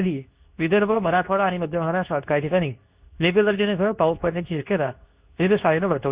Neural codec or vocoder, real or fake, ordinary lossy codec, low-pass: codec, 24 kHz, 0.9 kbps, WavTokenizer, medium speech release version 2; fake; Opus, 64 kbps; 3.6 kHz